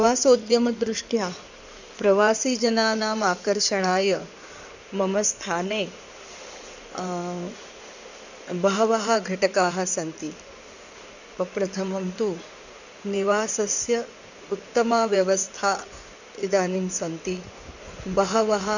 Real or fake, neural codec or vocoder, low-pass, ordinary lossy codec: fake; codec, 16 kHz in and 24 kHz out, 2.2 kbps, FireRedTTS-2 codec; 7.2 kHz; none